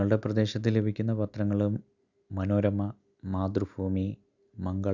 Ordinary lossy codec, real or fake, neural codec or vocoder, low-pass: none; real; none; 7.2 kHz